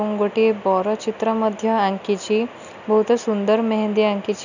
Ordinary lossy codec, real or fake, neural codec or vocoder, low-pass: none; real; none; 7.2 kHz